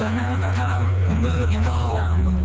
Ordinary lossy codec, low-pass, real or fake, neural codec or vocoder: none; none; fake; codec, 16 kHz, 2 kbps, FreqCodec, smaller model